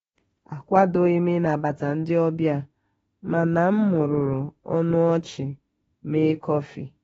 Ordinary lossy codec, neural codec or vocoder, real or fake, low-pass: AAC, 24 kbps; autoencoder, 48 kHz, 32 numbers a frame, DAC-VAE, trained on Japanese speech; fake; 19.8 kHz